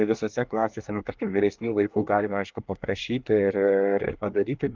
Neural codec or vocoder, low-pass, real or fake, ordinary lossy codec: codec, 24 kHz, 1 kbps, SNAC; 7.2 kHz; fake; Opus, 24 kbps